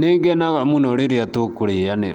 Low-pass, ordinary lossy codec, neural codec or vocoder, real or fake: 19.8 kHz; Opus, 32 kbps; none; real